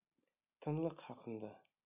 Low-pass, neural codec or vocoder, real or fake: 3.6 kHz; none; real